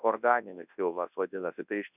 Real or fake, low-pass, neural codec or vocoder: fake; 3.6 kHz; codec, 24 kHz, 0.9 kbps, WavTokenizer, large speech release